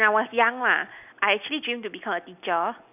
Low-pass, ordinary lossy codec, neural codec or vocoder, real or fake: 3.6 kHz; none; none; real